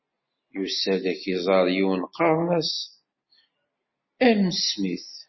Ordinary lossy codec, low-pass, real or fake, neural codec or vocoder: MP3, 24 kbps; 7.2 kHz; real; none